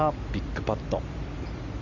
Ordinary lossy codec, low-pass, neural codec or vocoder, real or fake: none; 7.2 kHz; none; real